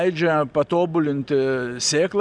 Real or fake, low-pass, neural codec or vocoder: real; 9.9 kHz; none